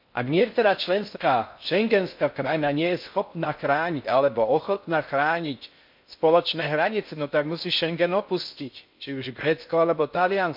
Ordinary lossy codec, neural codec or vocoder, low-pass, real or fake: MP3, 48 kbps; codec, 16 kHz in and 24 kHz out, 0.6 kbps, FocalCodec, streaming, 4096 codes; 5.4 kHz; fake